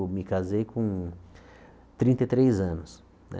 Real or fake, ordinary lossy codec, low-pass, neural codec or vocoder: real; none; none; none